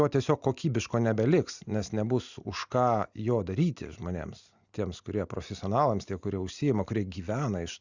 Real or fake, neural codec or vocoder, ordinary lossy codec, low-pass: real; none; Opus, 64 kbps; 7.2 kHz